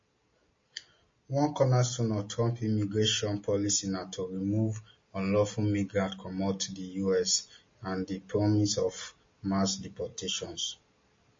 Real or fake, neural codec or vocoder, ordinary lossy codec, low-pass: real; none; MP3, 32 kbps; 7.2 kHz